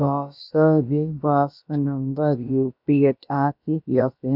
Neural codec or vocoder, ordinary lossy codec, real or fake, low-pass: codec, 16 kHz, about 1 kbps, DyCAST, with the encoder's durations; none; fake; 5.4 kHz